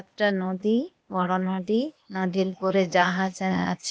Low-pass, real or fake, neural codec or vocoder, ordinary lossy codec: none; fake; codec, 16 kHz, 0.8 kbps, ZipCodec; none